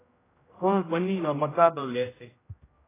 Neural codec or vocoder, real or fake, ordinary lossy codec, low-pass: codec, 16 kHz, 0.5 kbps, X-Codec, HuBERT features, trained on general audio; fake; AAC, 16 kbps; 3.6 kHz